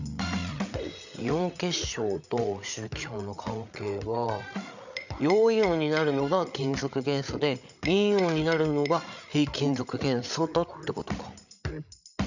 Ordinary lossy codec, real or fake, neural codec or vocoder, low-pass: none; fake; codec, 16 kHz, 8 kbps, FreqCodec, larger model; 7.2 kHz